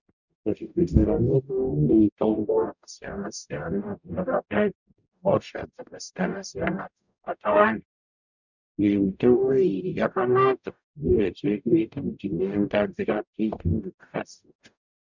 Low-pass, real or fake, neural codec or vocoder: 7.2 kHz; fake; codec, 44.1 kHz, 0.9 kbps, DAC